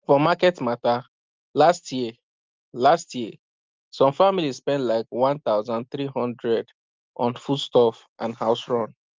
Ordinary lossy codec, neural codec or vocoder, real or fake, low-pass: Opus, 32 kbps; none; real; 7.2 kHz